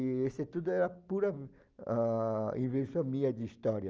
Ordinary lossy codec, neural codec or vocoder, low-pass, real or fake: Opus, 24 kbps; none; 7.2 kHz; real